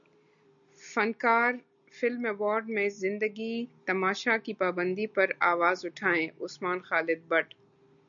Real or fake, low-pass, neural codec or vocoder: real; 7.2 kHz; none